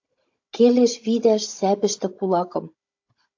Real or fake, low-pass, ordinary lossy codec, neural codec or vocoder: fake; 7.2 kHz; AAC, 48 kbps; codec, 16 kHz, 16 kbps, FunCodec, trained on Chinese and English, 50 frames a second